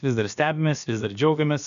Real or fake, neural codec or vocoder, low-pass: fake; codec, 16 kHz, about 1 kbps, DyCAST, with the encoder's durations; 7.2 kHz